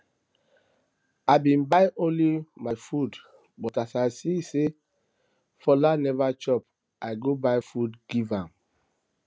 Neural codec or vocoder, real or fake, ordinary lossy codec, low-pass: none; real; none; none